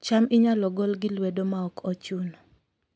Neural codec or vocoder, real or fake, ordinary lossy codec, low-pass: none; real; none; none